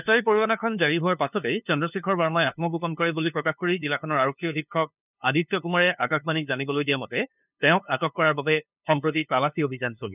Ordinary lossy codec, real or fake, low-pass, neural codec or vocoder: none; fake; 3.6 kHz; codec, 16 kHz, 2 kbps, FunCodec, trained on LibriTTS, 25 frames a second